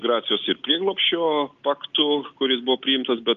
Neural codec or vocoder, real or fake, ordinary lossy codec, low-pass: none; real; Opus, 24 kbps; 7.2 kHz